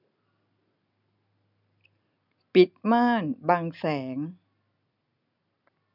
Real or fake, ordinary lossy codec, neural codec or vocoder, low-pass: real; none; none; 5.4 kHz